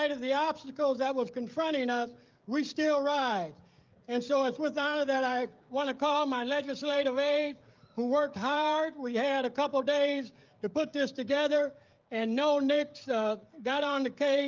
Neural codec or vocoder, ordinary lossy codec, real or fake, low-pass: codec, 16 kHz, 16 kbps, FreqCodec, smaller model; Opus, 32 kbps; fake; 7.2 kHz